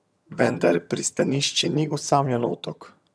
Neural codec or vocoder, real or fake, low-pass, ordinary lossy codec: vocoder, 22.05 kHz, 80 mel bands, HiFi-GAN; fake; none; none